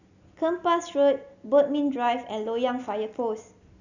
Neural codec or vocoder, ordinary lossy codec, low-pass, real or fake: none; none; 7.2 kHz; real